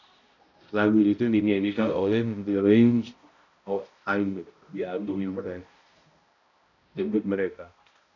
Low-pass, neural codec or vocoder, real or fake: 7.2 kHz; codec, 16 kHz, 0.5 kbps, X-Codec, HuBERT features, trained on balanced general audio; fake